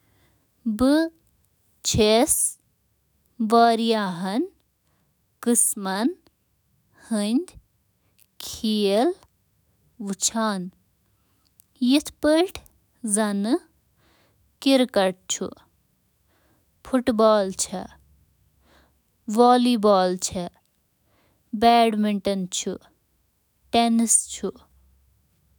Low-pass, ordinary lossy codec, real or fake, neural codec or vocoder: none; none; fake; autoencoder, 48 kHz, 128 numbers a frame, DAC-VAE, trained on Japanese speech